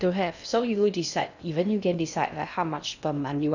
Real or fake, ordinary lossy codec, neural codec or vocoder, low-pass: fake; none; codec, 16 kHz in and 24 kHz out, 0.6 kbps, FocalCodec, streaming, 2048 codes; 7.2 kHz